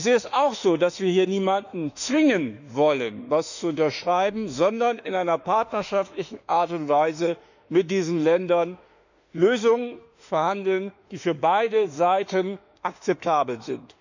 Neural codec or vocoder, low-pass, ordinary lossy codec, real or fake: autoencoder, 48 kHz, 32 numbers a frame, DAC-VAE, trained on Japanese speech; 7.2 kHz; none; fake